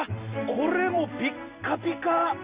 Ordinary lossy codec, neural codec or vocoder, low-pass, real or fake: Opus, 64 kbps; none; 3.6 kHz; real